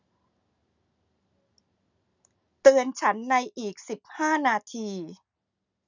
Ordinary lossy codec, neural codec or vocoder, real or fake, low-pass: none; none; real; 7.2 kHz